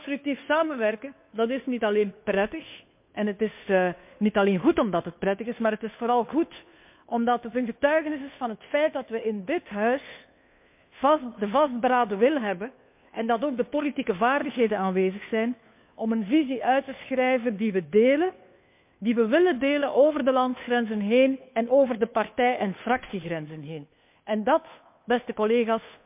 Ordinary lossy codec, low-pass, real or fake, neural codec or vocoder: MP3, 32 kbps; 3.6 kHz; fake; codec, 16 kHz, 2 kbps, FunCodec, trained on Chinese and English, 25 frames a second